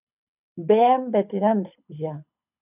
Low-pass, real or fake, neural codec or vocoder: 3.6 kHz; fake; codec, 24 kHz, 6 kbps, HILCodec